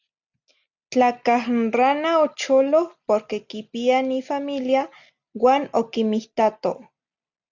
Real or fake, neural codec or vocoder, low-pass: real; none; 7.2 kHz